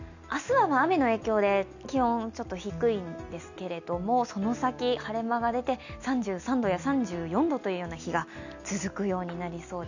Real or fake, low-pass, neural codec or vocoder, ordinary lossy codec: real; 7.2 kHz; none; none